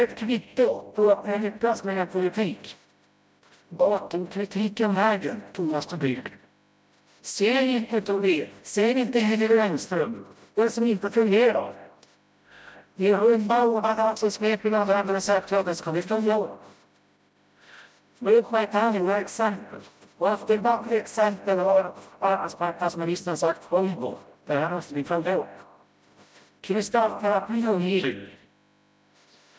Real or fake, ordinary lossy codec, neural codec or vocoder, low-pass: fake; none; codec, 16 kHz, 0.5 kbps, FreqCodec, smaller model; none